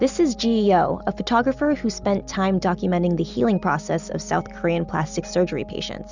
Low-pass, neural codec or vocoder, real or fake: 7.2 kHz; none; real